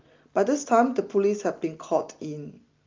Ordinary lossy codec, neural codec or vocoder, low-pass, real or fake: Opus, 24 kbps; none; 7.2 kHz; real